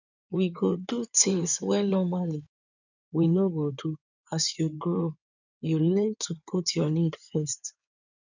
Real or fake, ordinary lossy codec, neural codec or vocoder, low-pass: fake; none; codec, 16 kHz in and 24 kHz out, 2.2 kbps, FireRedTTS-2 codec; 7.2 kHz